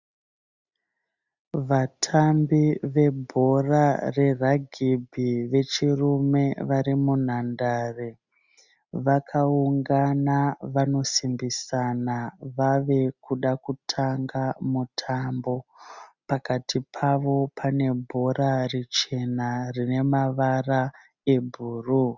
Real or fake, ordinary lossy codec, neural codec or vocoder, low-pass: real; Opus, 64 kbps; none; 7.2 kHz